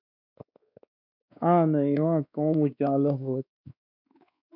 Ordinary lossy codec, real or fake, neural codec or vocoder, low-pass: MP3, 48 kbps; fake; codec, 16 kHz, 2 kbps, X-Codec, WavLM features, trained on Multilingual LibriSpeech; 5.4 kHz